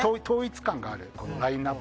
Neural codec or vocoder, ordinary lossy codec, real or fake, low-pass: none; none; real; none